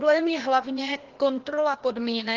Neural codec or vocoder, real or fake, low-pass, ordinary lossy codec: codec, 16 kHz, 0.8 kbps, ZipCodec; fake; 7.2 kHz; Opus, 16 kbps